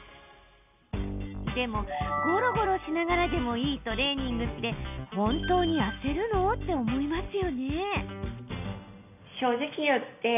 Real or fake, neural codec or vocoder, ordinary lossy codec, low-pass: real; none; none; 3.6 kHz